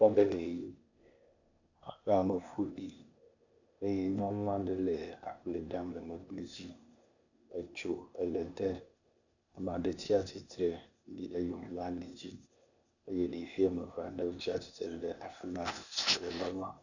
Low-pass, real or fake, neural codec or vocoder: 7.2 kHz; fake; codec, 16 kHz, 0.8 kbps, ZipCodec